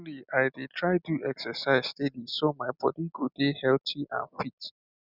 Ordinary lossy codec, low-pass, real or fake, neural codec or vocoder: none; 5.4 kHz; real; none